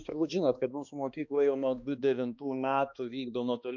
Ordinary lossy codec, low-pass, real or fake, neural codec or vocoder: MP3, 64 kbps; 7.2 kHz; fake; codec, 16 kHz, 2 kbps, X-Codec, HuBERT features, trained on balanced general audio